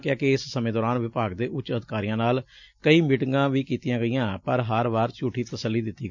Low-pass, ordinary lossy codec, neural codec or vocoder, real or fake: 7.2 kHz; MP3, 64 kbps; none; real